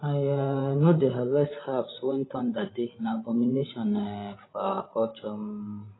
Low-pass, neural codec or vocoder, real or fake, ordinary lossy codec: 7.2 kHz; codec, 16 kHz, 16 kbps, FreqCodec, larger model; fake; AAC, 16 kbps